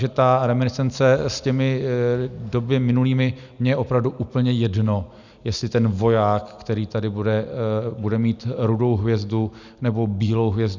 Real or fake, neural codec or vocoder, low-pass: real; none; 7.2 kHz